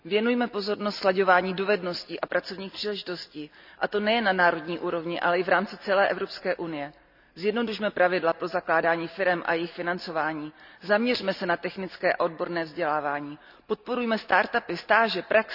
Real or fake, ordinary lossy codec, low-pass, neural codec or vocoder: real; none; 5.4 kHz; none